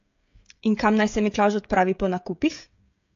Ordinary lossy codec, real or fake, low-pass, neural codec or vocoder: AAC, 48 kbps; fake; 7.2 kHz; codec, 16 kHz, 16 kbps, FreqCodec, smaller model